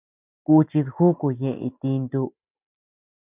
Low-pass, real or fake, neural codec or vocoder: 3.6 kHz; real; none